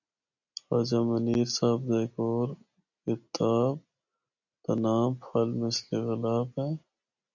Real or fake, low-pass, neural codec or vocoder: real; 7.2 kHz; none